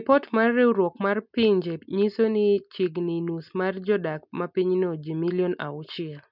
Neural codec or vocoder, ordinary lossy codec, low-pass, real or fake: none; none; 5.4 kHz; real